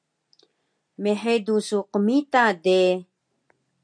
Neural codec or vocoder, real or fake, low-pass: none; real; 9.9 kHz